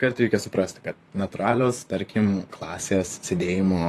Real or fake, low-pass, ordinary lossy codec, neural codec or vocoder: fake; 14.4 kHz; AAC, 48 kbps; vocoder, 44.1 kHz, 128 mel bands, Pupu-Vocoder